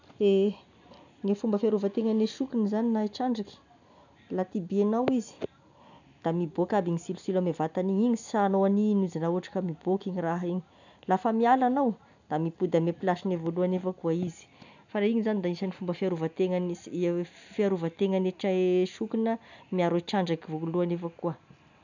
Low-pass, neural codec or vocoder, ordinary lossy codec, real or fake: 7.2 kHz; none; none; real